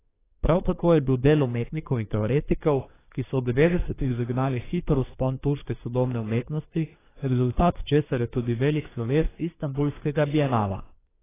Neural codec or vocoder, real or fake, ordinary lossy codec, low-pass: codec, 24 kHz, 1 kbps, SNAC; fake; AAC, 16 kbps; 3.6 kHz